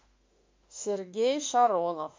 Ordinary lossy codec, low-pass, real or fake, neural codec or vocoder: MP3, 48 kbps; 7.2 kHz; fake; autoencoder, 48 kHz, 32 numbers a frame, DAC-VAE, trained on Japanese speech